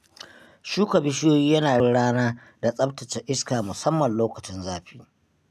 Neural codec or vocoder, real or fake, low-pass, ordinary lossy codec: none; real; 14.4 kHz; none